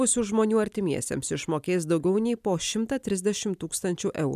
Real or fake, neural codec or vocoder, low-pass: real; none; 14.4 kHz